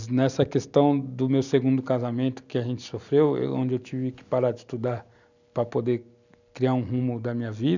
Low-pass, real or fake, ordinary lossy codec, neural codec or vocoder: 7.2 kHz; real; none; none